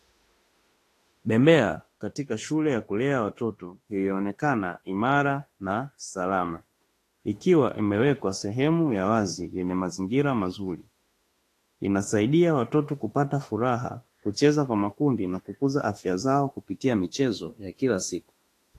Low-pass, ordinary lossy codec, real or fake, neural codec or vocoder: 14.4 kHz; AAC, 48 kbps; fake; autoencoder, 48 kHz, 32 numbers a frame, DAC-VAE, trained on Japanese speech